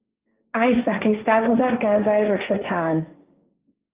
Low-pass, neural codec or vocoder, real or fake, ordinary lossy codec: 3.6 kHz; codec, 16 kHz, 1.1 kbps, Voila-Tokenizer; fake; Opus, 24 kbps